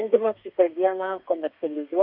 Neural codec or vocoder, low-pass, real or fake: codec, 32 kHz, 1.9 kbps, SNAC; 5.4 kHz; fake